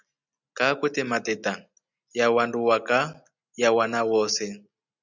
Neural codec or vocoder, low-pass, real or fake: none; 7.2 kHz; real